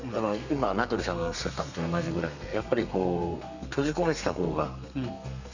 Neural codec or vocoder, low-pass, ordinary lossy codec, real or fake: codec, 32 kHz, 1.9 kbps, SNAC; 7.2 kHz; none; fake